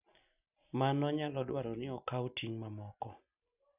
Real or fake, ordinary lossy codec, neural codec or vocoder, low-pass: real; none; none; 3.6 kHz